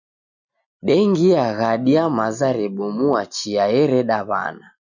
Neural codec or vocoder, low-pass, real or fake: none; 7.2 kHz; real